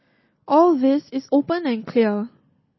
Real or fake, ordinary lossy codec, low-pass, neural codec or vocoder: real; MP3, 24 kbps; 7.2 kHz; none